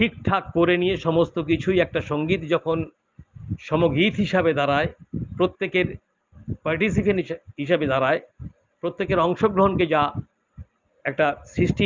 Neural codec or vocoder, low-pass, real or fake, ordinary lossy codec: none; 7.2 kHz; real; Opus, 24 kbps